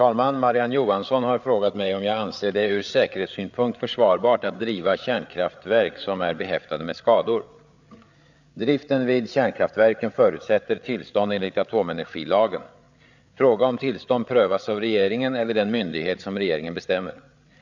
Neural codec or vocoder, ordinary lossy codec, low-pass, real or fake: codec, 16 kHz, 8 kbps, FreqCodec, larger model; none; 7.2 kHz; fake